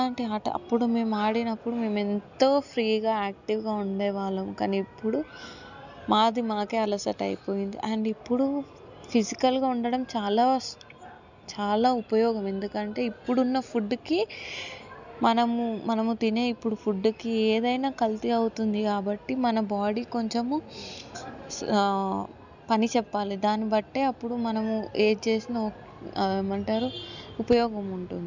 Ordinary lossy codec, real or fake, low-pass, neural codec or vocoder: none; real; 7.2 kHz; none